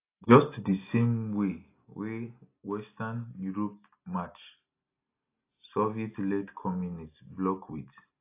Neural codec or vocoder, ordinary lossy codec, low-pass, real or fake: none; AAC, 24 kbps; 3.6 kHz; real